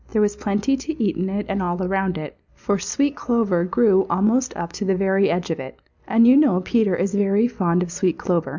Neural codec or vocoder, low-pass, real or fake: none; 7.2 kHz; real